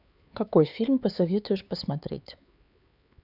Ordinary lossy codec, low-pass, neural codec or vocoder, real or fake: none; 5.4 kHz; codec, 16 kHz, 4 kbps, X-Codec, HuBERT features, trained on LibriSpeech; fake